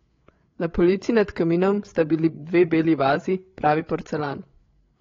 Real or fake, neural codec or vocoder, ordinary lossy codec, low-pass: fake; codec, 16 kHz, 8 kbps, FreqCodec, larger model; AAC, 32 kbps; 7.2 kHz